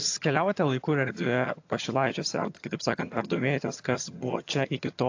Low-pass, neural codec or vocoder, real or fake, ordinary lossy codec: 7.2 kHz; vocoder, 22.05 kHz, 80 mel bands, HiFi-GAN; fake; AAC, 48 kbps